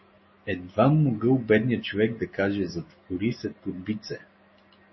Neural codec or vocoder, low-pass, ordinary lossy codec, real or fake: none; 7.2 kHz; MP3, 24 kbps; real